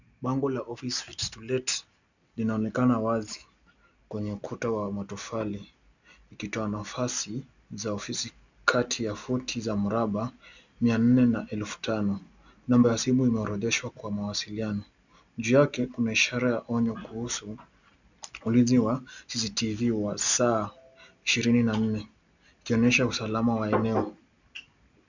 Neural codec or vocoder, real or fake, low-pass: none; real; 7.2 kHz